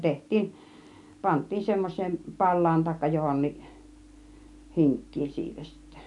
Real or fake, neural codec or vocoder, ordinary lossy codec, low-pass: real; none; none; 10.8 kHz